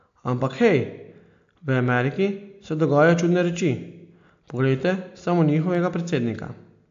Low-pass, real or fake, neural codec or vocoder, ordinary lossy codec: 7.2 kHz; real; none; AAC, 64 kbps